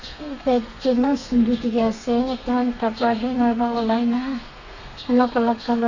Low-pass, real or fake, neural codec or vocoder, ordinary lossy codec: 7.2 kHz; fake; codec, 32 kHz, 1.9 kbps, SNAC; none